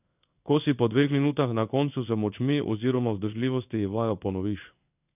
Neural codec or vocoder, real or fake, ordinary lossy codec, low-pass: codec, 16 kHz in and 24 kHz out, 1 kbps, XY-Tokenizer; fake; none; 3.6 kHz